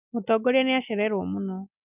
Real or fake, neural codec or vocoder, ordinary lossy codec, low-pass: real; none; none; 3.6 kHz